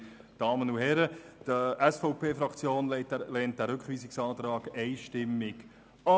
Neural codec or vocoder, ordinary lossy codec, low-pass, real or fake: none; none; none; real